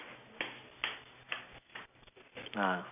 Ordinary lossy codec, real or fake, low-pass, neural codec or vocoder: none; real; 3.6 kHz; none